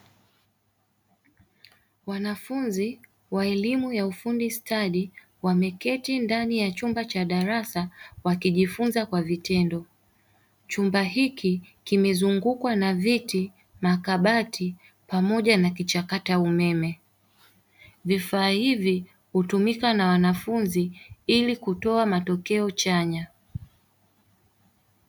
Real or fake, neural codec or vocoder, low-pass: real; none; 19.8 kHz